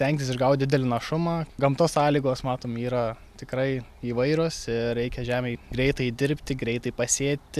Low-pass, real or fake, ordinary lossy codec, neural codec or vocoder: 14.4 kHz; real; AAC, 96 kbps; none